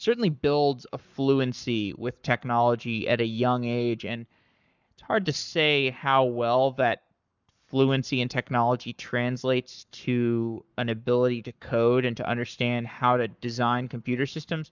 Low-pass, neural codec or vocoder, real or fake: 7.2 kHz; codec, 44.1 kHz, 7.8 kbps, Pupu-Codec; fake